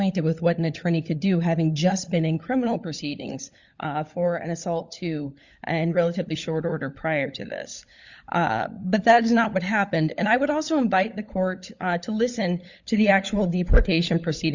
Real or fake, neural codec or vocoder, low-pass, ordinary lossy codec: fake; codec, 16 kHz, 16 kbps, FunCodec, trained on LibriTTS, 50 frames a second; 7.2 kHz; Opus, 64 kbps